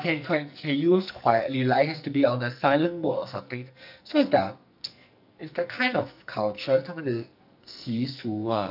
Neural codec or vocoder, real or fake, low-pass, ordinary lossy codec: codec, 44.1 kHz, 2.6 kbps, SNAC; fake; 5.4 kHz; none